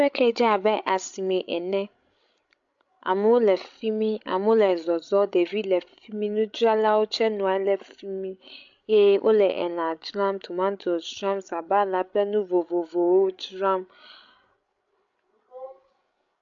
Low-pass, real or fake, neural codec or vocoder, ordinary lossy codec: 7.2 kHz; fake; codec, 16 kHz, 16 kbps, FreqCodec, larger model; AAC, 64 kbps